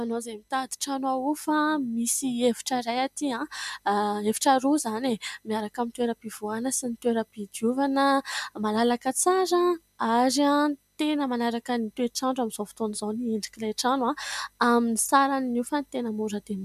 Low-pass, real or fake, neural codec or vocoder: 14.4 kHz; real; none